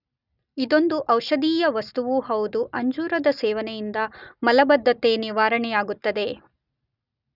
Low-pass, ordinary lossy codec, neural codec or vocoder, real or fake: 5.4 kHz; none; none; real